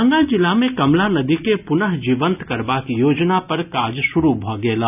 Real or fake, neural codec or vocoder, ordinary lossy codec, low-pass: real; none; none; 3.6 kHz